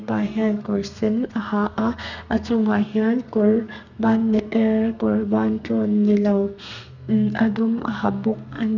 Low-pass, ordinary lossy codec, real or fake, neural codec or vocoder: 7.2 kHz; none; fake; codec, 32 kHz, 1.9 kbps, SNAC